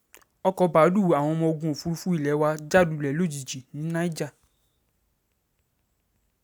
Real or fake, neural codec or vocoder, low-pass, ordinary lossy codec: real; none; none; none